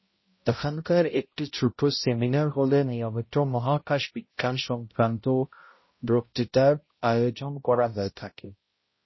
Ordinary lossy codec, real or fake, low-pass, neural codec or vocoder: MP3, 24 kbps; fake; 7.2 kHz; codec, 16 kHz, 0.5 kbps, X-Codec, HuBERT features, trained on balanced general audio